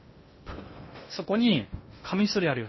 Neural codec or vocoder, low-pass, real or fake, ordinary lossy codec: codec, 16 kHz in and 24 kHz out, 0.6 kbps, FocalCodec, streaming, 2048 codes; 7.2 kHz; fake; MP3, 24 kbps